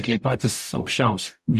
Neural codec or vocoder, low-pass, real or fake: codec, 44.1 kHz, 0.9 kbps, DAC; 14.4 kHz; fake